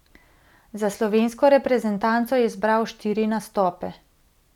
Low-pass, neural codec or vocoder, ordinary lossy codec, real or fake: 19.8 kHz; none; none; real